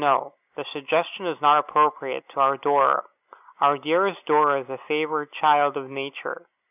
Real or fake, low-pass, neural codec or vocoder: real; 3.6 kHz; none